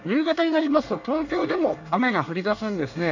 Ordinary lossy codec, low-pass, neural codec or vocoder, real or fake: none; 7.2 kHz; codec, 24 kHz, 1 kbps, SNAC; fake